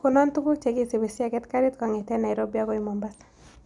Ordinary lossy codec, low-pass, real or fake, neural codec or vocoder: none; 10.8 kHz; real; none